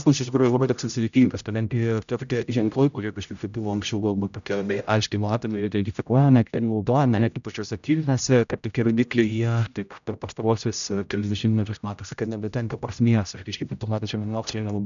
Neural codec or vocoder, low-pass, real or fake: codec, 16 kHz, 0.5 kbps, X-Codec, HuBERT features, trained on general audio; 7.2 kHz; fake